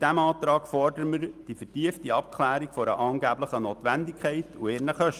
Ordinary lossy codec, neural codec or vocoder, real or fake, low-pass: Opus, 32 kbps; none; real; 14.4 kHz